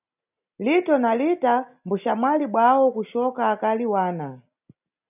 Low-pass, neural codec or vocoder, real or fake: 3.6 kHz; none; real